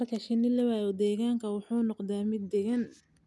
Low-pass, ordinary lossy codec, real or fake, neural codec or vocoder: none; none; real; none